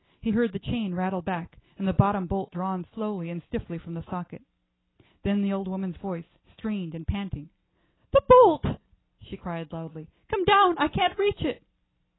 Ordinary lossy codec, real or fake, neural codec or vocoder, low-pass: AAC, 16 kbps; real; none; 7.2 kHz